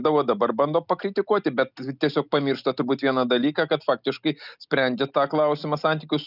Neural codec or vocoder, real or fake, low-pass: none; real; 5.4 kHz